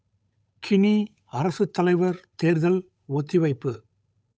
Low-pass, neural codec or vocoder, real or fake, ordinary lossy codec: none; codec, 16 kHz, 8 kbps, FunCodec, trained on Chinese and English, 25 frames a second; fake; none